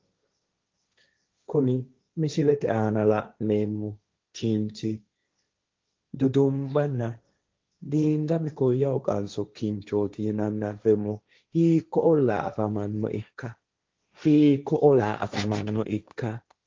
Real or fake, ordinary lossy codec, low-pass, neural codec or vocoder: fake; Opus, 32 kbps; 7.2 kHz; codec, 16 kHz, 1.1 kbps, Voila-Tokenizer